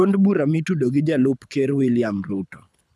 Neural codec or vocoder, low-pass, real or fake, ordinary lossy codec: codec, 24 kHz, 6 kbps, HILCodec; none; fake; none